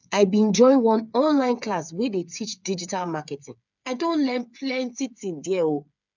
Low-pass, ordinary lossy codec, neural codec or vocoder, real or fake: 7.2 kHz; none; codec, 16 kHz, 8 kbps, FreqCodec, smaller model; fake